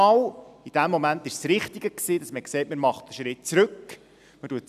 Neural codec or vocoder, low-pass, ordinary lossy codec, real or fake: none; 14.4 kHz; none; real